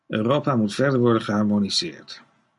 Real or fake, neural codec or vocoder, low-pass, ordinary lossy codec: real; none; 10.8 kHz; MP3, 96 kbps